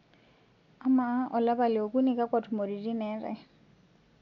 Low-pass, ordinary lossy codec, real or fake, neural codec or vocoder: 7.2 kHz; none; real; none